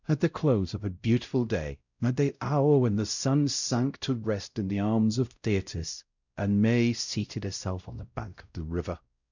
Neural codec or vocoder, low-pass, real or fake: codec, 16 kHz, 0.5 kbps, X-Codec, WavLM features, trained on Multilingual LibriSpeech; 7.2 kHz; fake